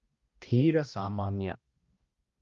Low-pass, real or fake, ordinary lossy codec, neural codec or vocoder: 7.2 kHz; fake; Opus, 32 kbps; codec, 16 kHz, 1 kbps, X-Codec, HuBERT features, trained on balanced general audio